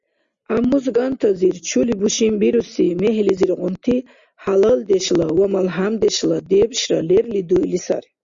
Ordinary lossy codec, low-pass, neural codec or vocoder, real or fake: Opus, 64 kbps; 7.2 kHz; none; real